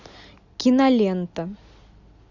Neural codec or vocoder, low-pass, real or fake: none; 7.2 kHz; real